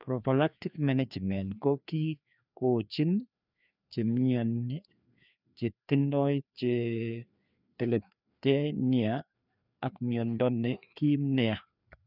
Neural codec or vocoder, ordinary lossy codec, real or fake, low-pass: codec, 16 kHz, 2 kbps, FreqCodec, larger model; none; fake; 5.4 kHz